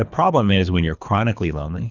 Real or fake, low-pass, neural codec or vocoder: fake; 7.2 kHz; codec, 24 kHz, 3 kbps, HILCodec